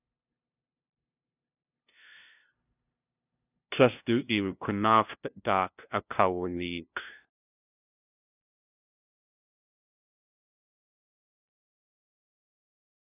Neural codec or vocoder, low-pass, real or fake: codec, 16 kHz, 0.5 kbps, FunCodec, trained on LibriTTS, 25 frames a second; 3.6 kHz; fake